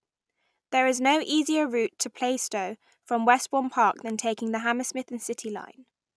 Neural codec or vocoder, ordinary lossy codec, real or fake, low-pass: none; none; real; none